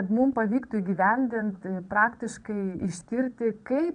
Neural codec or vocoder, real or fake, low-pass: vocoder, 22.05 kHz, 80 mel bands, Vocos; fake; 9.9 kHz